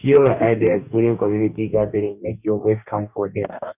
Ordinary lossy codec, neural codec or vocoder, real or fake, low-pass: none; codec, 44.1 kHz, 2.6 kbps, DAC; fake; 3.6 kHz